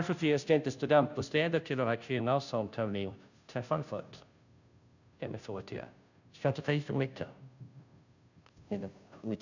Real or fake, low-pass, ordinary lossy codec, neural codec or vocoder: fake; 7.2 kHz; none; codec, 16 kHz, 0.5 kbps, FunCodec, trained on Chinese and English, 25 frames a second